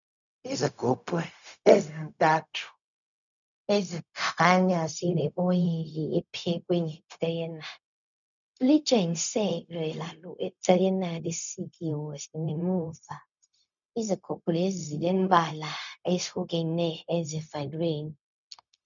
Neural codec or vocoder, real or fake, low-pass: codec, 16 kHz, 0.4 kbps, LongCat-Audio-Codec; fake; 7.2 kHz